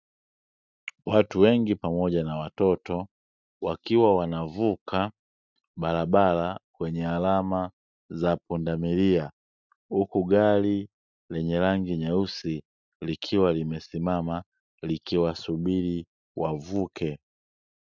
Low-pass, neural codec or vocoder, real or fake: 7.2 kHz; none; real